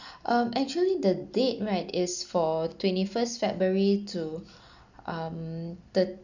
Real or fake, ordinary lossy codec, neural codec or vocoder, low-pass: real; none; none; 7.2 kHz